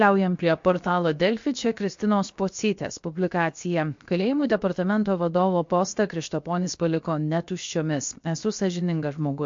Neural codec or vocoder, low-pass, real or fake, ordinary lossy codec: codec, 16 kHz, 0.7 kbps, FocalCodec; 7.2 kHz; fake; MP3, 48 kbps